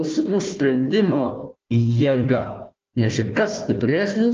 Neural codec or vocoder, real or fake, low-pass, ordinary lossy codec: codec, 16 kHz, 1 kbps, FunCodec, trained on Chinese and English, 50 frames a second; fake; 7.2 kHz; Opus, 24 kbps